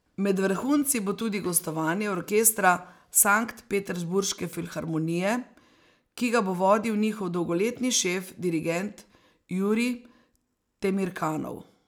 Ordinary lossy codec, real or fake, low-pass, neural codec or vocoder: none; real; none; none